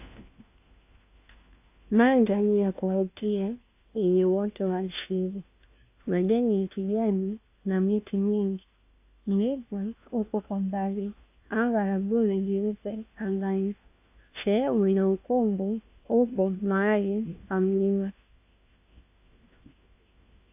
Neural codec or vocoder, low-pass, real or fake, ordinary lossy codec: codec, 16 kHz, 1 kbps, FunCodec, trained on LibriTTS, 50 frames a second; 3.6 kHz; fake; AAC, 32 kbps